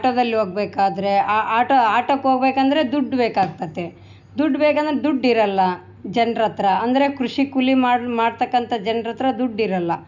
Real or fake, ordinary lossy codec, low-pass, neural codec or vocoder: real; none; 7.2 kHz; none